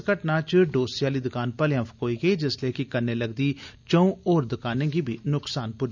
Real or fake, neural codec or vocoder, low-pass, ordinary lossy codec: real; none; 7.2 kHz; none